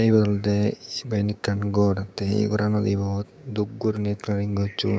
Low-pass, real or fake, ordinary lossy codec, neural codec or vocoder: none; fake; none; codec, 16 kHz, 6 kbps, DAC